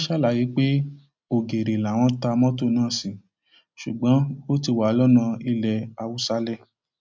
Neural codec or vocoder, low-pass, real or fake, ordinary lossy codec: none; none; real; none